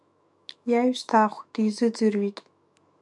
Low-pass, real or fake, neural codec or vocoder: 10.8 kHz; fake; autoencoder, 48 kHz, 128 numbers a frame, DAC-VAE, trained on Japanese speech